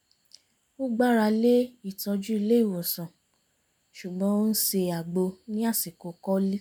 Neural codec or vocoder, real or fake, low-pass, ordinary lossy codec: none; real; none; none